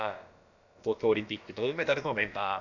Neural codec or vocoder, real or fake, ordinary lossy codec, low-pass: codec, 16 kHz, about 1 kbps, DyCAST, with the encoder's durations; fake; none; 7.2 kHz